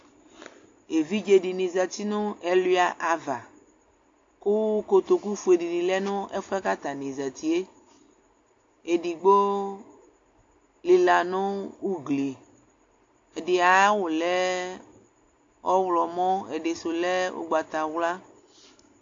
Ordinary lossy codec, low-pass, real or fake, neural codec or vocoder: AAC, 48 kbps; 7.2 kHz; real; none